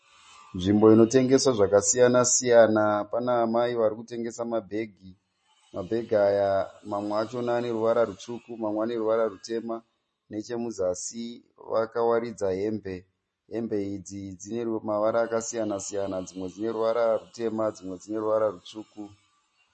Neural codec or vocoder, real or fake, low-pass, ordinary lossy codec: none; real; 10.8 kHz; MP3, 32 kbps